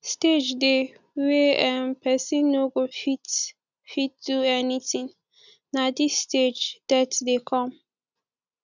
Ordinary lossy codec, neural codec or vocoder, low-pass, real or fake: none; none; 7.2 kHz; real